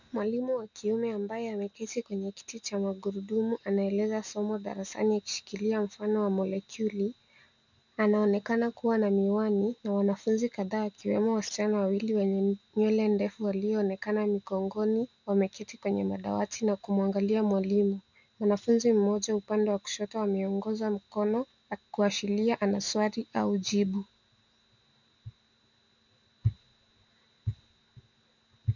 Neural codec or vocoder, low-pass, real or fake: none; 7.2 kHz; real